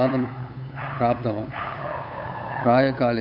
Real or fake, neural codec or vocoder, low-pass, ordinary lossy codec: fake; codec, 16 kHz, 8 kbps, FunCodec, trained on LibriTTS, 25 frames a second; 5.4 kHz; none